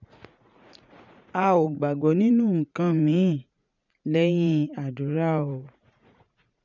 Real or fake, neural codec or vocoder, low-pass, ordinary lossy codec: fake; vocoder, 44.1 kHz, 80 mel bands, Vocos; 7.2 kHz; none